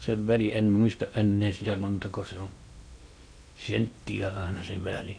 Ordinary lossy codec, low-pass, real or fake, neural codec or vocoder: Opus, 64 kbps; 9.9 kHz; fake; codec, 16 kHz in and 24 kHz out, 0.8 kbps, FocalCodec, streaming, 65536 codes